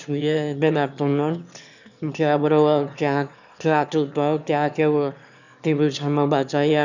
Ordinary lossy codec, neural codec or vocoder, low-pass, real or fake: none; autoencoder, 22.05 kHz, a latent of 192 numbers a frame, VITS, trained on one speaker; 7.2 kHz; fake